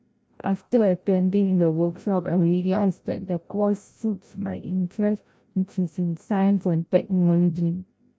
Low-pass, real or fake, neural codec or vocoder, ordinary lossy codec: none; fake; codec, 16 kHz, 0.5 kbps, FreqCodec, larger model; none